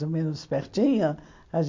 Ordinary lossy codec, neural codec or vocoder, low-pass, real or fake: MP3, 48 kbps; none; 7.2 kHz; real